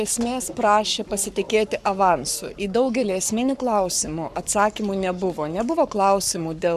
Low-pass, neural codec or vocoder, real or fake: 14.4 kHz; codec, 44.1 kHz, 7.8 kbps, Pupu-Codec; fake